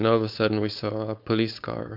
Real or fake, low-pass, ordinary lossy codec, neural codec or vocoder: fake; 5.4 kHz; MP3, 48 kbps; codec, 16 kHz, 4.8 kbps, FACodec